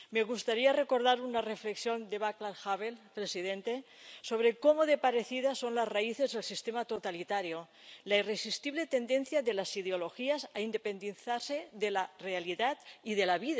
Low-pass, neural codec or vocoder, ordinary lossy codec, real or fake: none; none; none; real